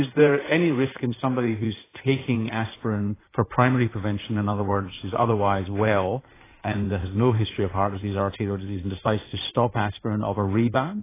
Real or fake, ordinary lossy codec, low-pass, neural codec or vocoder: fake; AAC, 16 kbps; 3.6 kHz; codec, 16 kHz in and 24 kHz out, 2.2 kbps, FireRedTTS-2 codec